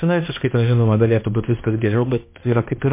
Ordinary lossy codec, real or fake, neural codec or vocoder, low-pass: MP3, 24 kbps; fake; codec, 16 kHz, 1 kbps, X-Codec, HuBERT features, trained on balanced general audio; 3.6 kHz